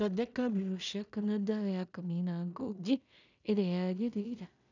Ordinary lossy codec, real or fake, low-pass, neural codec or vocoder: none; fake; 7.2 kHz; codec, 16 kHz in and 24 kHz out, 0.4 kbps, LongCat-Audio-Codec, two codebook decoder